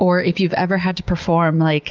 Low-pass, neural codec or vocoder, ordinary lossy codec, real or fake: 7.2 kHz; none; Opus, 32 kbps; real